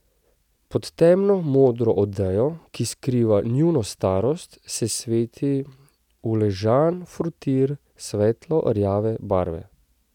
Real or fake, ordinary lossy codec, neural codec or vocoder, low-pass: real; none; none; 19.8 kHz